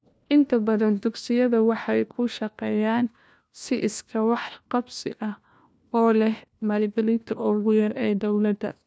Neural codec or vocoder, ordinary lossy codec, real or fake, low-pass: codec, 16 kHz, 1 kbps, FunCodec, trained on LibriTTS, 50 frames a second; none; fake; none